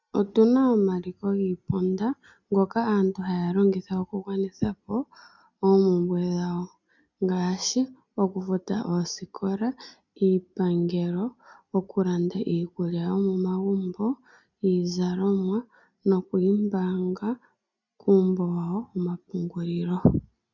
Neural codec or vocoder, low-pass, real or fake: none; 7.2 kHz; real